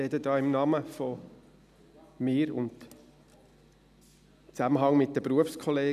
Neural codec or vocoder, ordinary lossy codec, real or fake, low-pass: none; none; real; 14.4 kHz